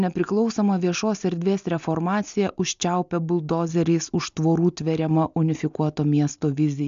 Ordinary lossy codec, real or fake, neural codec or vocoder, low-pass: MP3, 64 kbps; real; none; 7.2 kHz